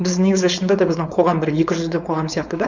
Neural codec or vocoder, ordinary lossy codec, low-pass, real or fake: codec, 16 kHz, 4.8 kbps, FACodec; none; 7.2 kHz; fake